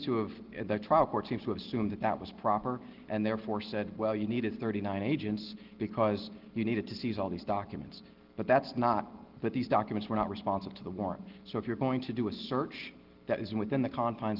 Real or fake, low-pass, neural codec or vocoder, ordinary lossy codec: real; 5.4 kHz; none; Opus, 16 kbps